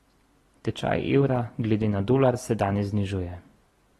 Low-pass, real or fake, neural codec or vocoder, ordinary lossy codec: 19.8 kHz; real; none; AAC, 32 kbps